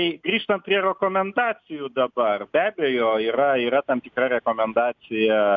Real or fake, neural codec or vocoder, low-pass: real; none; 7.2 kHz